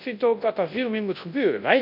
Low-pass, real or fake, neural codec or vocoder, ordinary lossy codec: 5.4 kHz; fake; codec, 24 kHz, 0.9 kbps, WavTokenizer, large speech release; none